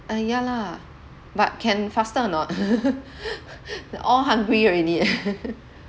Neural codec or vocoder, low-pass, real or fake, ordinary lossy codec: none; none; real; none